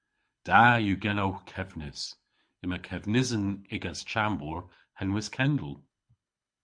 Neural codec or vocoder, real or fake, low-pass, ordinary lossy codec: codec, 24 kHz, 6 kbps, HILCodec; fake; 9.9 kHz; MP3, 64 kbps